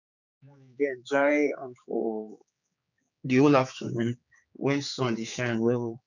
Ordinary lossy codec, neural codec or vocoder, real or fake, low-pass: none; codec, 16 kHz, 4 kbps, X-Codec, HuBERT features, trained on general audio; fake; 7.2 kHz